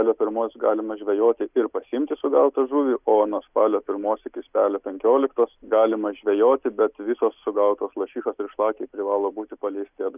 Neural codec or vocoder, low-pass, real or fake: none; 3.6 kHz; real